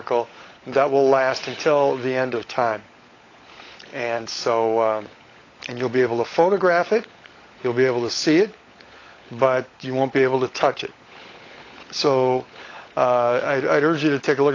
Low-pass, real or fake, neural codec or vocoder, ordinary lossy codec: 7.2 kHz; fake; codec, 16 kHz, 16 kbps, FunCodec, trained on LibriTTS, 50 frames a second; AAC, 32 kbps